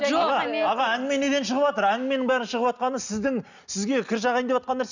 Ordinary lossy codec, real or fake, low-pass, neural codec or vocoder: none; real; 7.2 kHz; none